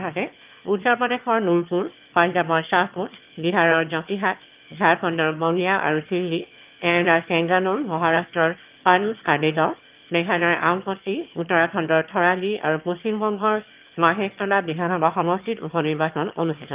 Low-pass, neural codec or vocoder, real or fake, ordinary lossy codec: 3.6 kHz; autoencoder, 22.05 kHz, a latent of 192 numbers a frame, VITS, trained on one speaker; fake; Opus, 32 kbps